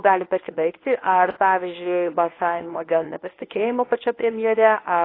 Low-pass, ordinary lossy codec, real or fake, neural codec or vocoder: 5.4 kHz; AAC, 24 kbps; fake; codec, 24 kHz, 0.9 kbps, WavTokenizer, medium speech release version 1